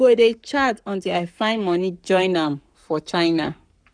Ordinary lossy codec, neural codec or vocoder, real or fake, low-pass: none; codec, 44.1 kHz, 7.8 kbps, Pupu-Codec; fake; 9.9 kHz